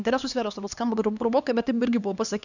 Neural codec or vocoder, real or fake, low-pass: codec, 16 kHz, 2 kbps, X-Codec, HuBERT features, trained on LibriSpeech; fake; 7.2 kHz